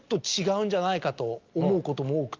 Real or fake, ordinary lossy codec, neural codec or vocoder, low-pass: real; Opus, 32 kbps; none; 7.2 kHz